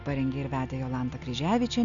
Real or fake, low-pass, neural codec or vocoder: real; 7.2 kHz; none